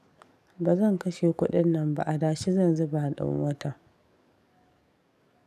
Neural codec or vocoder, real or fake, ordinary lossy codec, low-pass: codec, 44.1 kHz, 7.8 kbps, DAC; fake; none; 14.4 kHz